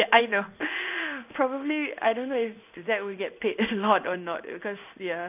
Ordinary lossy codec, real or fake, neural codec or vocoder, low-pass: none; fake; codec, 16 kHz in and 24 kHz out, 1 kbps, XY-Tokenizer; 3.6 kHz